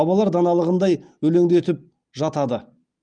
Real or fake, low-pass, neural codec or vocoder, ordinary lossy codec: real; 9.9 kHz; none; Opus, 32 kbps